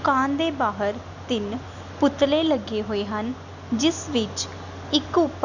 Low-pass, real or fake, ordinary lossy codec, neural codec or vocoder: 7.2 kHz; real; none; none